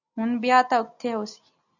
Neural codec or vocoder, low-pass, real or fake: none; 7.2 kHz; real